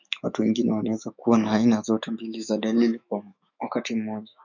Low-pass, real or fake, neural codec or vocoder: 7.2 kHz; fake; autoencoder, 48 kHz, 128 numbers a frame, DAC-VAE, trained on Japanese speech